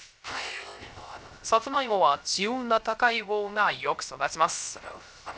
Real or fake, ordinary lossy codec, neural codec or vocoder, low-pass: fake; none; codec, 16 kHz, 0.3 kbps, FocalCodec; none